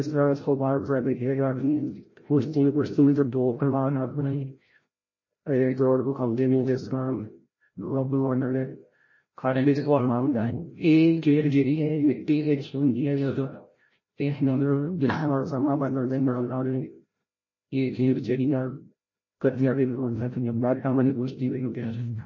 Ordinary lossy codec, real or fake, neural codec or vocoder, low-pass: MP3, 32 kbps; fake; codec, 16 kHz, 0.5 kbps, FreqCodec, larger model; 7.2 kHz